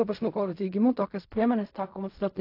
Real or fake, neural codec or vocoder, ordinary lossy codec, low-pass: fake; codec, 16 kHz in and 24 kHz out, 0.4 kbps, LongCat-Audio-Codec, fine tuned four codebook decoder; AAC, 32 kbps; 5.4 kHz